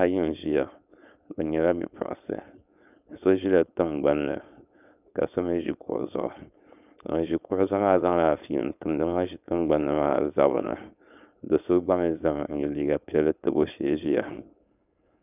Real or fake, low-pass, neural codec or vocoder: fake; 3.6 kHz; codec, 16 kHz, 4.8 kbps, FACodec